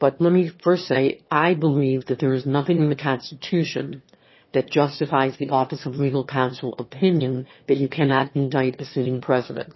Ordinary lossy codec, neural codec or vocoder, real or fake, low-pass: MP3, 24 kbps; autoencoder, 22.05 kHz, a latent of 192 numbers a frame, VITS, trained on one speaker; fake; 7.2 kHz